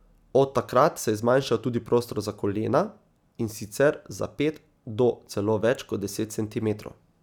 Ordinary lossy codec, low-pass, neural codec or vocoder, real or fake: none; 19.8 kHz; none; real